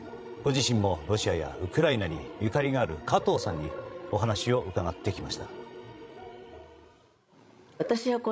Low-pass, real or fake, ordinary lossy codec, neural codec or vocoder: none; fake; none; codec, 16 kHz, 16 kbps, FreqCodec, larger model